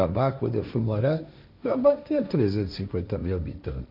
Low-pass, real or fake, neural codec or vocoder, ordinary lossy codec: 5.4 kHz; fake; codec, 16 kHz, 1.1 kbps, Voila-Tokenizer; MP3, 48 kbps